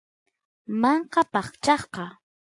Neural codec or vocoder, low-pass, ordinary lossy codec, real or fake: none; 10.8 kHz; AAC, 64 kbps; real